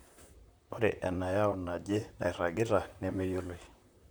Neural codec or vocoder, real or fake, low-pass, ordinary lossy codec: vocoder, 44.1 kHz, 128 mel bands, Pupu-Vocoder; fake; none; none